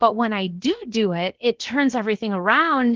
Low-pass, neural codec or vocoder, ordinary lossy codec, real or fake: 7.2 kHz; codec, 16 kHz, about 1 kbps, DyCAST, with the encoder's durations; Opus, 16 kbps; fake